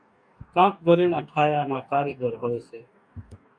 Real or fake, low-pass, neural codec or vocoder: fake; 9.9 kHz; codec, 44.1 kHz, 2.6 kbps, DAC